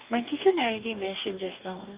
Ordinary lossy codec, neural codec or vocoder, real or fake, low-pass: Opus, 32 kbps; codec, 44.1 kHz, 2.6 kbps, DAC; fake; 3.6 kHz